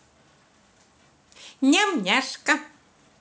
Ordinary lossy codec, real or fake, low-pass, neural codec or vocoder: none; real; none; none